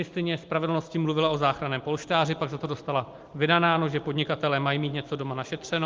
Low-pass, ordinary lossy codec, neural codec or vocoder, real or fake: 7.2 kHz; Opus, 32 kbps; none; real